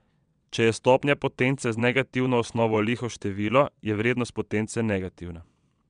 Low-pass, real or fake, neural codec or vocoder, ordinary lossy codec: 9.9 kHz; fake; vocoder, 22.05 kHz, 80 mel bands, WaveNeXt; MP3, 96 kbps